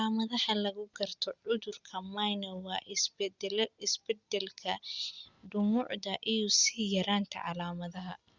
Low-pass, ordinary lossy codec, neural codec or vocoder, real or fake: 7.2 kHz; Opus, 64 kbps; none; real